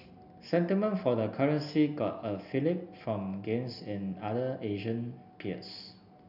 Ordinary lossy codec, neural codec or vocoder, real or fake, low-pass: none; none; real; 5.4 kHz